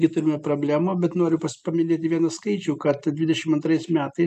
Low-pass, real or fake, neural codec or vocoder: 14.4 kHz; real; none